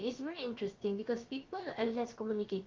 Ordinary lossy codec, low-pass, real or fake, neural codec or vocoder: Opus, 32 kbps; 7.2 kHz; fake; codec, 16 kHz, about 1 kbps, DyCAST, with the encoder's durations